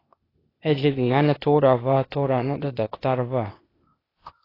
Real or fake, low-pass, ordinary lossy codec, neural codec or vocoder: fake; 5.4 kHz; AAC, 24 kbps; codec, 16 kHz, 0.8 kbps, ZipCodec